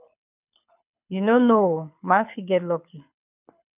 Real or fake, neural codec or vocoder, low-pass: fake; codec, 24 kHz, 6 kbps, HILCodec; 3.6 kHz